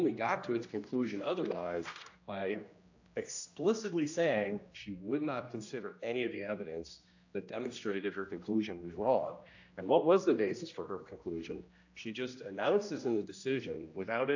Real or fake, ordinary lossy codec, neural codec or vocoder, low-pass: fake; AAC, 48 kbps; codec, 16 kHz, 1 kbps, X-Codec, HuBERT features, trained on general audio; 7.2 kHz